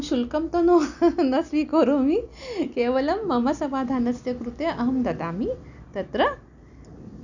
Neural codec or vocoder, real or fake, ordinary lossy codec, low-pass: none; real; none; 7.2 kHz